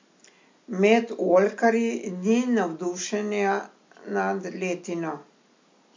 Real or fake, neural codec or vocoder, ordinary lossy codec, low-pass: real; none; MP3, 48 kbps; 7.2 kHz